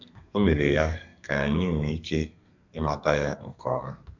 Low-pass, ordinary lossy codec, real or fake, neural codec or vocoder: 7.2 kHz; none; fake; codec, 32 kHz, 1.9 kbps, SNAC